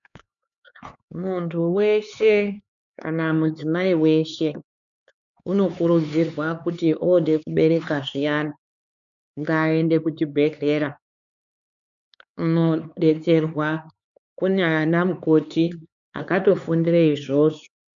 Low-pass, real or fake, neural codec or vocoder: 7.2 kHz; fake; codec, 16 kHz, 4 kbps, X-Codec, HuBERT features, trained on LibriSpeech